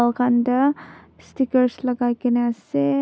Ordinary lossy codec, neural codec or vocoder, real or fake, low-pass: none; none; real; none